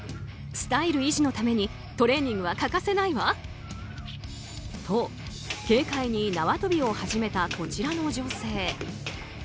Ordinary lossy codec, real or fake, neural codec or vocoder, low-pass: none; real; none; none